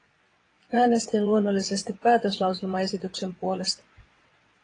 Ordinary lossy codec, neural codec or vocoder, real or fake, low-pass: AAC, 32 kbps; vocoder, 22.05 kHz, 80 mel bands, WaveNeXt; fake; 9.9 kHz